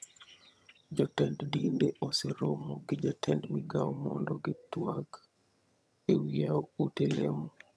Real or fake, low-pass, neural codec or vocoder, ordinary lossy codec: fake; none; vocoder, 22.05 kHz, 80 mel bands, HiFi-GAN; none